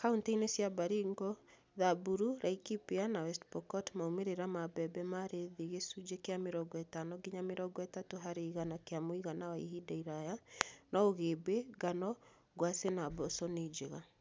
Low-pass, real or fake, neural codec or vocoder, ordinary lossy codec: none; real; none; none